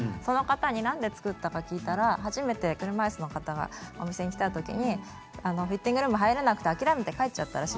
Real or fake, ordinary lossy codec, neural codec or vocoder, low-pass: real; none; none; none